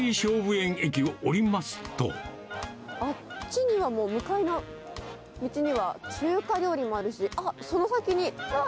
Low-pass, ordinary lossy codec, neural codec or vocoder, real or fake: none; none; none; real